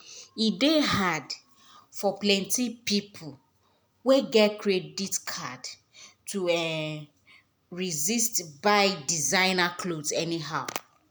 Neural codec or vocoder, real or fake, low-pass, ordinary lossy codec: none; real; none; none